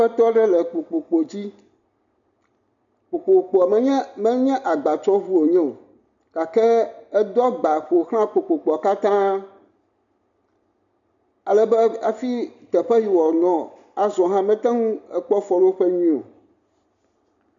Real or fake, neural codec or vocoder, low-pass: real; none; 7.2 kHz